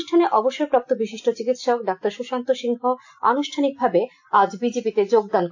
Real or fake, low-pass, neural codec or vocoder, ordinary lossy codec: real; 7.2 kHz; none; AAC, 48 kbps